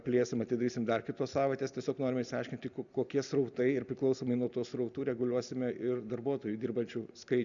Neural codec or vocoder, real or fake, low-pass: none; real; 7.2 kHz